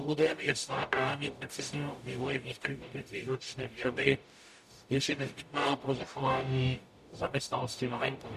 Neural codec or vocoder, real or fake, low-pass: codec, 44.1 kHz, 0.9 kbps, DAC; fake; 14.4 kHz